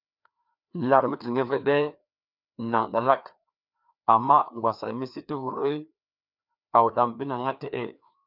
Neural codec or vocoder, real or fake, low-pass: codec, 16 kHz, 2 kbps, FreqCodec, larger model; fake; 5.4 kHz